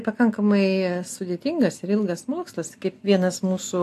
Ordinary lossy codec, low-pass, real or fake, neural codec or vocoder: AAC, 64 kbps; 14.4 kHz; real; none